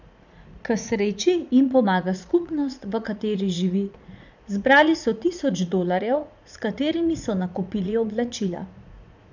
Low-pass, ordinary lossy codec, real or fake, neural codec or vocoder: 7.2 kHz; none; fake; vocoder, 44.1 kHz, 80 mel bands, Vocos